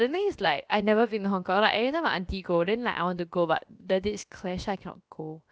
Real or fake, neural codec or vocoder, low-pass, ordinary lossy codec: fake; codec, 16 kHz, about 1 kbps, DyCAST, with the encoder's durations; none; none